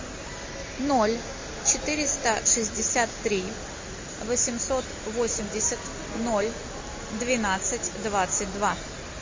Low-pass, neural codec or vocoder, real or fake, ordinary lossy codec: 7.2 kHz; none; real; MP3, 32 kbps